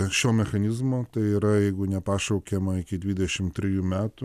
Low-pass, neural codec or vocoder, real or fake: 14.4 kHz; none; real